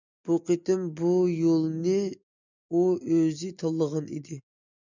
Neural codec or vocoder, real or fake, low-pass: none; real; 7.2 kHz